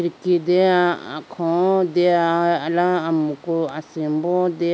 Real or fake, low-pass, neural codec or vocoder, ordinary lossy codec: real; none; none; none